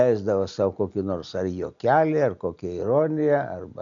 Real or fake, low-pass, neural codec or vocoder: real; 7.2 kHz; none